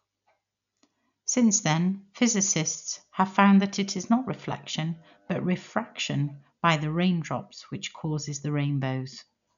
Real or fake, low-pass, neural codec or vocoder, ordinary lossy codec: real; 7.2 kHz; none; none